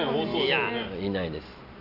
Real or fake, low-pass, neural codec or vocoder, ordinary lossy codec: real; 5.4 kHz; none; none